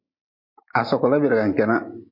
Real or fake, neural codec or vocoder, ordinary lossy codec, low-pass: real; none; MP3, 32 kbps; 5.4 kHz